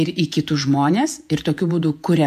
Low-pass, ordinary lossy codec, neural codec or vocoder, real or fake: 14.4 kHz; AAC, 96 kbps; vocoder, 48 kHz, 128 mel bands, Vocos; fake